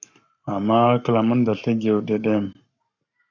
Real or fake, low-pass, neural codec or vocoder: fake; 7.2 kHz; codec, 44.1 kHz, 7.8 kbps, Pupu-Codec